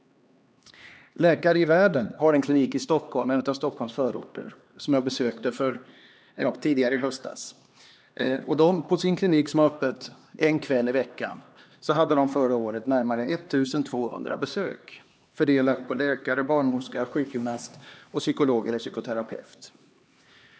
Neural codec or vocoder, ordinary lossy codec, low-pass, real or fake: codec, 16 kHz, 2 kbps, X-Codec, HuBERT features, trained on LibriSpeech; none; none; fake